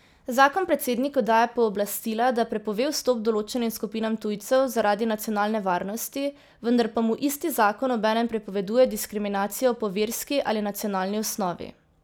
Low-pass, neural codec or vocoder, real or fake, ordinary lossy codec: none; none; real; none